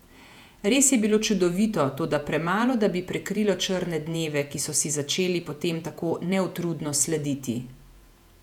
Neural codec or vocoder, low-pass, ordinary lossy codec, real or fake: vocoder, 48 kHz, 128 mel bands, Vocos; 19.8 kHz; none; fake